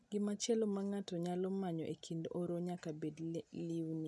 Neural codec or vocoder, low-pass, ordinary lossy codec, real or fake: none; none; none; real